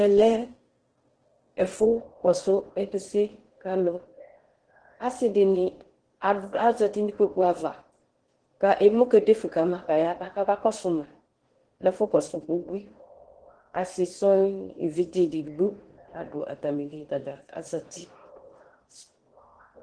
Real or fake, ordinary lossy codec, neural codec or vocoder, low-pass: fake; Opus, 16 kbps; codec, 16 kHz in and 24 kHz out, 0.8 kbps, FocalCodec, streaming, 65536 codes; 9.9 kHz